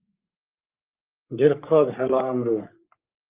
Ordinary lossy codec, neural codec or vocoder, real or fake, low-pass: Opus, 24 kbps; codec, 44.1 kHz, 3.4 kbps, Pupu-Codec; fake; 3.6 kHz